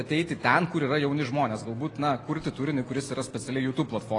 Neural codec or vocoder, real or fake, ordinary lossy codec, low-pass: none; real; AAC, 32 kbps; 10.8 kHz